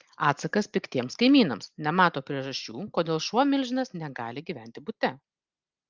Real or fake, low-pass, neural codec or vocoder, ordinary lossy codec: real; 7.2 kHz; none; Opus, 32 kbps